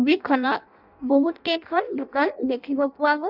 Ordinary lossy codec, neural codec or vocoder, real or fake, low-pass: none; codec, 16 kHz in and 24 kHz out, 0.6 kbps, FireRedTTS-2 codec; fake; 5.4 kHz